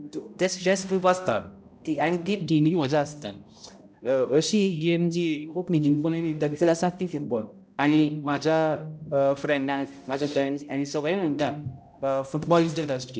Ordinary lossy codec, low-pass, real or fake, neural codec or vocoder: none; none; fake; codec, 16 kHz, 0.5 kbps, X-Codec, HuBERT features, trained on balanced general audio